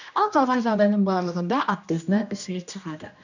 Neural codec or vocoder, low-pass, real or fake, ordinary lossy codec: codec, 16 kHz, 1 kbps, X-Codec, HuBERT features, trained on general audio; 7.2 kHz; fake; none